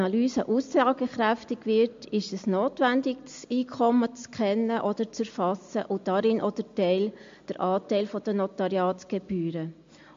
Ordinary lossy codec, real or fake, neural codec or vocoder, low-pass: MP3, 48 kbps; real; none; 7.2 kHz